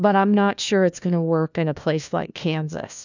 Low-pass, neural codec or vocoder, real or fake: 7.2 kHz; codec, 16 kHz, 1 kbps, FunCodec, trained on LibriTTS, 50 frames a second; fake